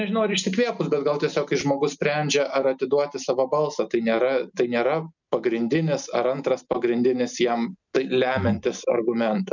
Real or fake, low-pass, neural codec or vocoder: real; 7.2 kHz; none